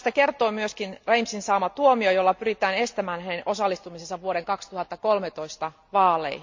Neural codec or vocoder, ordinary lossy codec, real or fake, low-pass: none; MP3, 48 kbps; real; 7.2 kHz